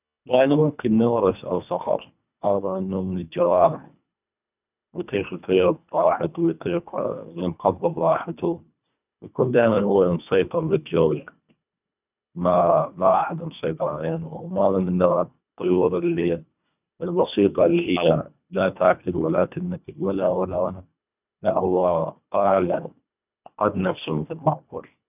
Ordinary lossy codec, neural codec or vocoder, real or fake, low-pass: none; codec, 24 kHz, 1.5 kbps, HILCodec; fake; 3.6 kHz